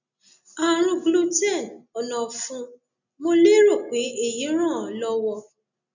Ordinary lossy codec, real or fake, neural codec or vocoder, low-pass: none; real; none; 7.2 kHz